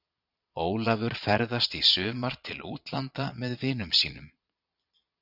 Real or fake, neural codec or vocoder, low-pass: fake; vocoder, 22.05 kHz, 80 mel bands, Vocos; 5.4 kHz